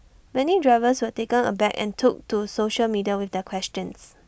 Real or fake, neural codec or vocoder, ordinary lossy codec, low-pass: real; none; none; none